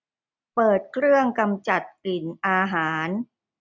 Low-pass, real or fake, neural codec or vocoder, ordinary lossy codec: none; real; none; none